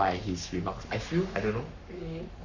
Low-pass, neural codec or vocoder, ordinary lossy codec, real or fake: 7.2 kHz; codec, 44.1 kHz, 7.8 kbps, Pupu-Codec; none; fake